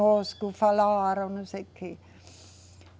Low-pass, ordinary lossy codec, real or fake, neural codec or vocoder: none; none; real; none